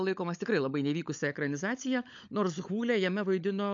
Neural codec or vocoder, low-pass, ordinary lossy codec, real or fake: codec, 16 kHz, 16 kbps, FunCodec, trained on LibriTTS, 50 frames a second; 7.2 kHz; AAC, 64 kbps; fake